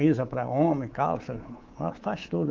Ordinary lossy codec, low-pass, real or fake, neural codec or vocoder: Opus, 32 kbps; 7.2 kHz; fake; codec, 24 kHz, 3.1 kbps, DualCodec